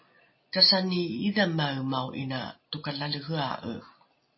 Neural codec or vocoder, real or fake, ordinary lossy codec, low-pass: none; real; MP3, 24 kbps; 7.2 kHz